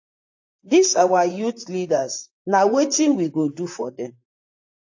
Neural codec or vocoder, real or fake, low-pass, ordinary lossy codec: vocoder, 44.1 kHz, 128 mel bands, Pupu-Vocoder; fake; 7.2 kHz; AAC, 48 kbps